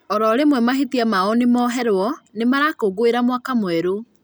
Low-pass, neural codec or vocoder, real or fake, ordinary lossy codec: none; none; real; none